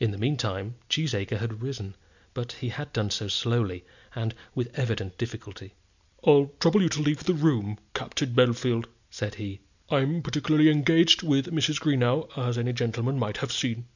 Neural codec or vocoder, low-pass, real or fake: none; 7.2 kHz; real